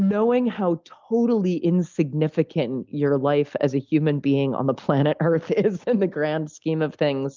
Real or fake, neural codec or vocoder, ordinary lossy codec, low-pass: real; none; Opus, 24 kbps; 7.2 kHz